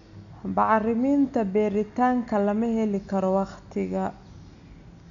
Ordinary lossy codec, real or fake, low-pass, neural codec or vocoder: none; real; 7.2 kHz; none